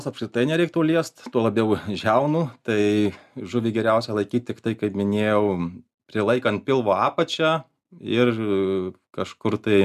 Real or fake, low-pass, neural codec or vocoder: real; 14.4 kHz; none